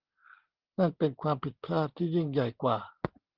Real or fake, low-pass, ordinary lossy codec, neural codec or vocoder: real; 5.4 kHz; Opus, 16 kbps; none